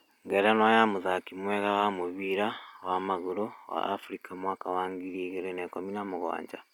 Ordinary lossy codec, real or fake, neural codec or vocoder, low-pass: none; real; none; 19.8 kHz